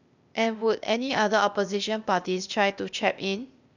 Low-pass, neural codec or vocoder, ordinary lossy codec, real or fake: 7.2 kHz; codec, 16 kHz, 0.8 kbps, ZipCodec; none; fake